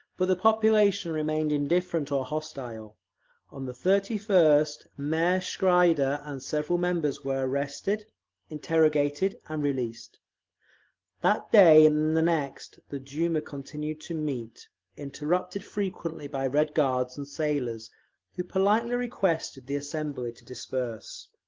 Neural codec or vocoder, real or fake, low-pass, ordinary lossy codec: none; real; 7.2 kHz; Opus, 16 kbps